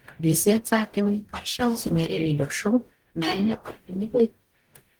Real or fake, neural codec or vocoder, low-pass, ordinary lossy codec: fake; codec, 44.1 kHz, 0.9 kbps, DAC; 19.8 kHz; Opus, 24 kbps